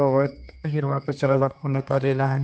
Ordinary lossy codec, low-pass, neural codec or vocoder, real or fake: none; none; codec, 16 kHz, 2 kbps, X-Codec, HuBERT features, trained on general audio; fake